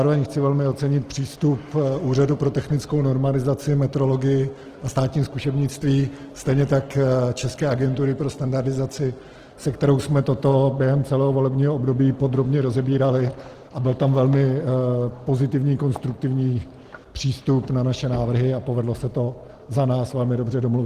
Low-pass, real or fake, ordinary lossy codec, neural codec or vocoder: 14.4 kHz; real; Opus, 16 kbps; none